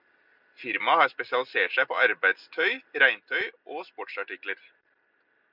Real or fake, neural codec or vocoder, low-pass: real; none; 5.4 kHz